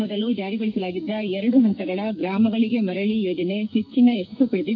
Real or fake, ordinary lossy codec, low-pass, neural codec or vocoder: fake; none; 7.2 kHz; codec, 44.1 kHz, 2.6 kbps, SNAC